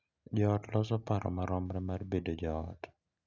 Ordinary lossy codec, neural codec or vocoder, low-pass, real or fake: none; none; 7.2 kHz; real